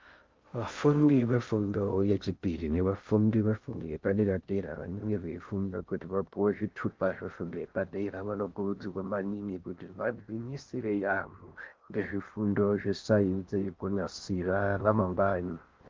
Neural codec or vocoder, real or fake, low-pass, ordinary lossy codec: codec, 16 kHz in and 24 kHz out, 0.6 kbps, FocalCodec, streaming, 2048 codes; fake; 7.2 kHz; Opus, 32 kbps